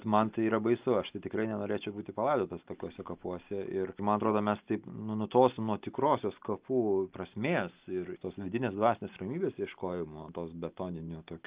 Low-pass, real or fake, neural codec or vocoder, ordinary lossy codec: 3.6 kHz; real; none; Opus, 24 kbps